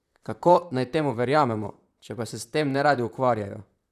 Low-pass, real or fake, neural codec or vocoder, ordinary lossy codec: 14.4 kHz; fake; vocoder, 44.1 kHz, 128 mel bands, Pupu-Vocoder; none